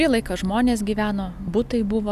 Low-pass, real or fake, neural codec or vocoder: 14.4 kHz; real; none